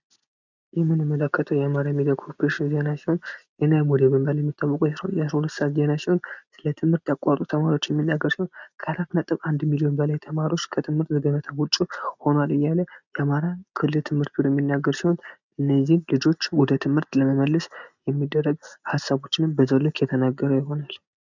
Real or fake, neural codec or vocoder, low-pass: real; none; 7.2 kHz